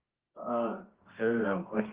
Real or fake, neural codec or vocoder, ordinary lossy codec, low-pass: fake; codec, 24 kHz, 0.9 kbps, WavTokenizer, medium music audio release; Opus, 16 kbps; 3.6 kHz